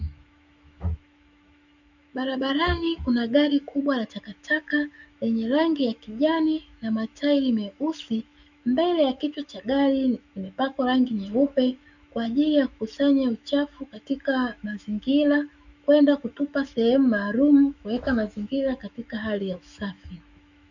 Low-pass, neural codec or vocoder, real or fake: 7.2 kHz; autoencoder, 48 kHz, 128 numbers a frame, DAC-VAE, trained on Japanese speech; fake